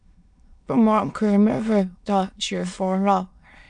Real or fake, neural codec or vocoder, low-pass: fake; autoencoder, 22.05 kHz, a latent of 192 numbers a frame, VITS, trained on many speakers; 9.9 kHz